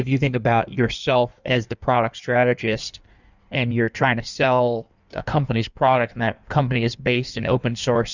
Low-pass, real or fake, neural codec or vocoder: 7.2 kHz; fake; codec, 16 kHz in and 24 kHz out, 1.1 kbps, FireRedTTS-2 codec